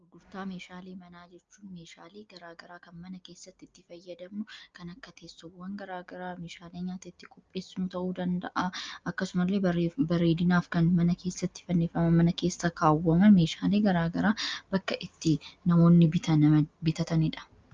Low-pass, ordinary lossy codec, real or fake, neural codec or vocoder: 7.2 kHz; Opus, 24 kbps; real; none